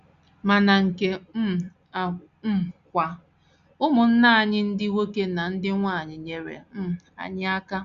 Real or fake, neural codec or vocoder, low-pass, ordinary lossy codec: real; none; 7.2 kHz; Opus, 64 kbps